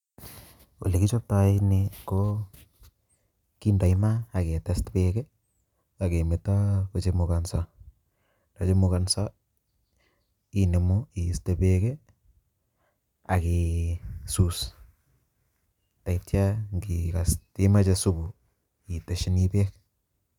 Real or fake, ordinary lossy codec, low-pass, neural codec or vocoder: real; none; 19.8 kHz; none